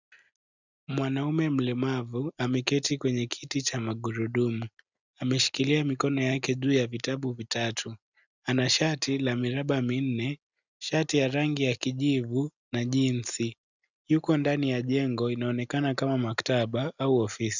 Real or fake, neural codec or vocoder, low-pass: real; none; 7.2 kHz